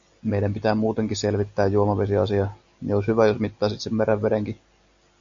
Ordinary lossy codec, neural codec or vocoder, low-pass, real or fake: AAC, 64 kbps; none; 7.2 kHz; real